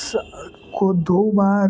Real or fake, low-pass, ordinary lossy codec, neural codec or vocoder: real; none; none; none